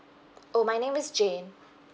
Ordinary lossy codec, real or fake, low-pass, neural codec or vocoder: none; real; none; none